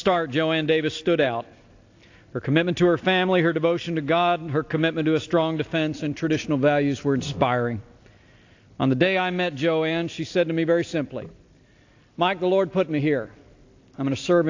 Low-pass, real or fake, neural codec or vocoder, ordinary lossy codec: 7.2 kHz; real; none; AAC, 48 kbps